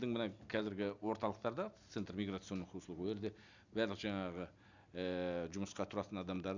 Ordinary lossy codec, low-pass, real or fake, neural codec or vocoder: none; 7.2 kHz; real; none